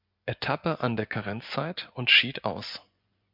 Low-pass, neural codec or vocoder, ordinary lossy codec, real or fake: 5.4 kHz; vocoder, 24 kHz, 100 mel bands, Vocos; MP3, 48 kbps; fake